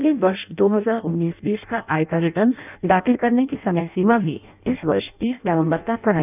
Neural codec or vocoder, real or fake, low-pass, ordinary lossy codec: codec, 16 kHz in and 24 kHz out, 0.6 kbps, FireRedTTS-2 codec; fake; 3.6 kHz; none